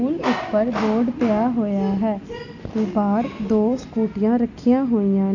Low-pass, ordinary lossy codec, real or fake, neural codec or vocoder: 7.2 kHz; none; real; none